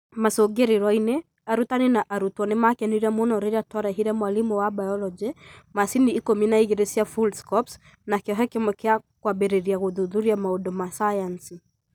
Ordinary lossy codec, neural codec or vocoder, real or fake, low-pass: none; none; real; none